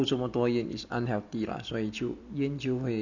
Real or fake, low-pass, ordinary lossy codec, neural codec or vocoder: real; 7.2 kHz; none; none